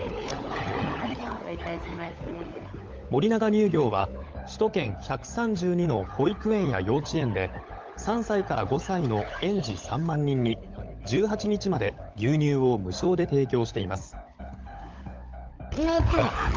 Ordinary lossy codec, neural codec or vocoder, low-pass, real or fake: Opus, 32 kbps; codec, 16 kHz, 16 kbps, FunCodec, trained on LibriTTS, 50 frames a second; 7.2 kHz; fake